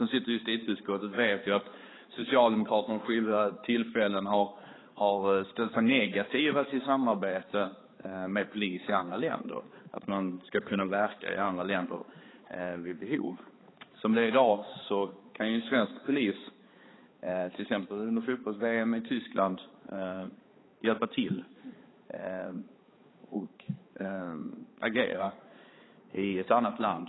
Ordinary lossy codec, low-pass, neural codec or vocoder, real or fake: AAC, 16 kbps; 7.2 kHz; codec, 16 kHz, 4 kbps, X-Codec, HuBERT features, trained on balanced general audio; fake